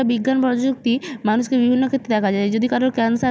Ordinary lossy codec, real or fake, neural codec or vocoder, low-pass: none; real; none; none